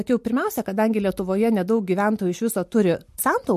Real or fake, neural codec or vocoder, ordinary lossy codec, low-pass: real; none; MP3, 64 kbps; 14.4 kHz